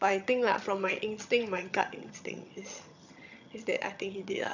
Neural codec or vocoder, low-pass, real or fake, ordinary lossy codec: vocoder, 22.05 kHz, 80 mel bands, HiFi-GAN; 7.2 kHz; fake; none